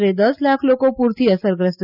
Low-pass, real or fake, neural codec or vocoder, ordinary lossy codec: 5.4 kHz; real; none; none